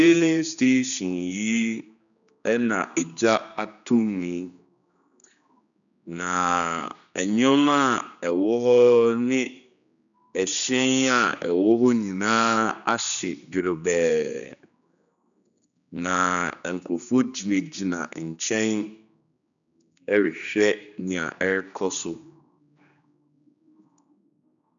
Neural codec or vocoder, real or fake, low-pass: codec, 16 kHz, 2 kbps, X-Codec, HuBERT features, trained on general audio; fake; 7.2 kHz